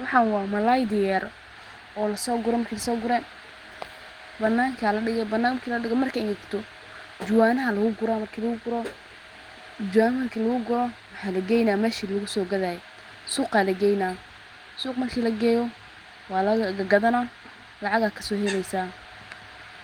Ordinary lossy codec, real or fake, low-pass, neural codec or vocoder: Opus, 24 kbps; real; 19.8 kHz; none